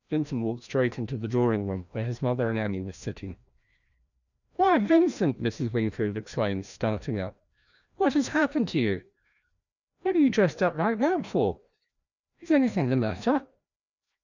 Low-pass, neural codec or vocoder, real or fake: 7.2 kHz; codec, 16 kHz, 1 kbps, FreqCodec, larger model; fake